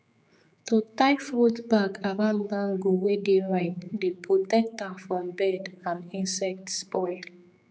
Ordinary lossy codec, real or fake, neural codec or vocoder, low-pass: none; fake; codec, 16 kHz, 4 kbps, X-Codec, HuBERT features, trained on general audio; none